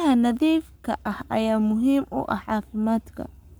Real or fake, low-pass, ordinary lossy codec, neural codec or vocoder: fake; none; none; codec, 44.1 kHz, 7.8 kbps, Pupu-Codec